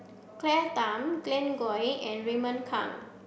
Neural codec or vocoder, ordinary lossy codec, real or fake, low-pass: none; none; real; none